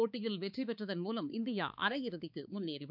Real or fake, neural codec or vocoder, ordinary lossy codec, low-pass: fake; codec, 16 kHz, 4 kbps, X-Codec, HuBERT features, trained on balanced general audio; none; 5.4 kHz